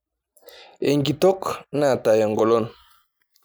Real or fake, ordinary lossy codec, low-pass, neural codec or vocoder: real; none; none; none